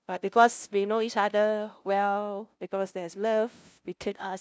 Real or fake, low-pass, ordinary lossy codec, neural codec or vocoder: fake; none; none; codec, 16 kHz, 0.5 kbps, FunCodec, trained on LibriTTS, 25 frames a second